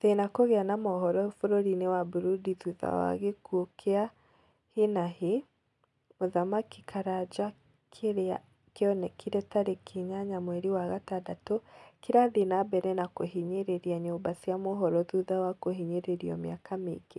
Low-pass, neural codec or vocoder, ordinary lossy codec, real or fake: none; none; none; real